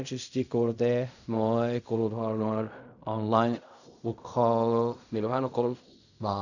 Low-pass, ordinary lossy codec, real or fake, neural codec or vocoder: 7.2 kHz; none; fake; codec, 16 kHz in and 24 kHz out, 0.4 kbps, LongCat-Audio-Codec, fine tuned four codebook decoder